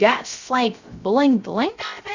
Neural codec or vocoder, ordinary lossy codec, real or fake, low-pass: codec, 16 kHz, 0.3 kbps, FocalCodec; Opus, 64 kbps; fake; 7.2 kHz